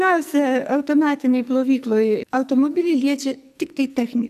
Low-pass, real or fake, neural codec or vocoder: 14.4 kHz; fake; codec, 44.1 kHz, 2.6 kbps, SNAC